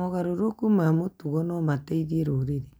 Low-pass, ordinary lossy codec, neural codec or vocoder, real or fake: none; none; none; real